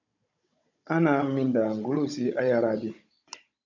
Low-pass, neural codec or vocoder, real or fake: 7.2 kHz; codec, 16 kHz, 16 kbps, FunCodec, trained on Chinese and English, 50 frames a second; fake